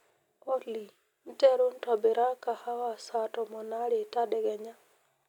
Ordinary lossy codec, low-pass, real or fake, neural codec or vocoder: none; 19.8 kHz; real; none